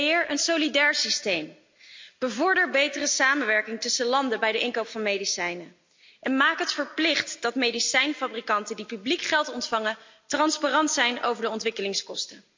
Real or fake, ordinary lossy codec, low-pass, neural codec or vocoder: real; MP3, 64 kbps; 7.2 kHz; none